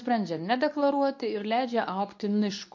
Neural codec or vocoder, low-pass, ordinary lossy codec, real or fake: codec, 24 kHz, 0.9 kbps, WavTokenizer, medium speech release version 2; 7.2 kHz; MP3, 48 kbps; fake